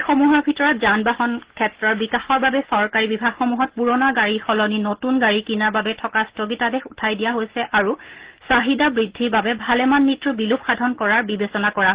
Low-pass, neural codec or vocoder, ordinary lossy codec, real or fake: 3.6 kHz; none; Opus, 16 kbps; real